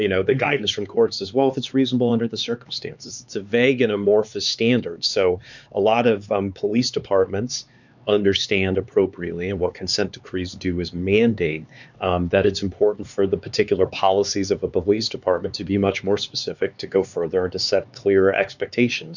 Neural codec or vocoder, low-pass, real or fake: codec, 16 kHz, 4 kbps, X-Codec, HuBERT features, trained on LibriSpeech; 7.2 kHz; fake